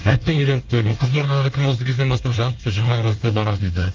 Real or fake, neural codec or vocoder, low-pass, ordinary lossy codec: fake; codec, 24 kHz, 1 kbps, SNAC; 7.2 kHz; Opus, 32 kbps